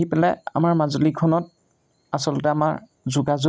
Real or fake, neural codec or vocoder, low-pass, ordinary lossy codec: real; none; none; none